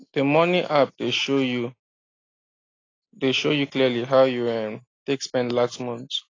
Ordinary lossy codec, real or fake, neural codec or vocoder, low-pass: AAC, 32 kbps; real; none; 7.2 kHz